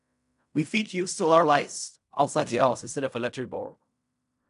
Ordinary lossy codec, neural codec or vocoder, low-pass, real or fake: none; codec, 16 kHz in and 24 kHz out, 0.4 kbps, LongCat-Audio-Codec, fine tuned four codebook decoder; 10.8 kHz; fake